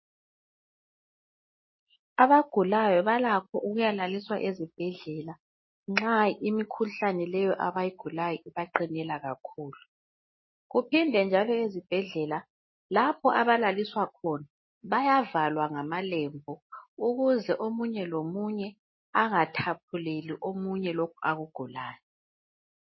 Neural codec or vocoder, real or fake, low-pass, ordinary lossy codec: none; real; 7.2 kHz; MP3, 24 kbps